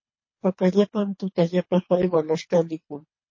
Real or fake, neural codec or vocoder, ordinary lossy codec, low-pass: fake; codec, 24 kHz, 3 kbps, HILCodec; MP3, 32 kbps; 7.2 kHz